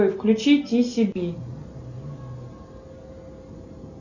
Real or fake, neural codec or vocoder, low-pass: real; none; 7.2 kHz